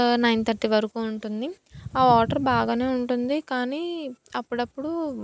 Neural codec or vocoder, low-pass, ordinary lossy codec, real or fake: none; none; none; real